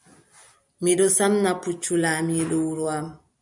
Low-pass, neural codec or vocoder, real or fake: 10.8 kHz; none; real